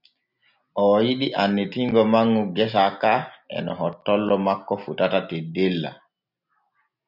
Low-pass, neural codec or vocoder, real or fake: 5.4 kHz; none; real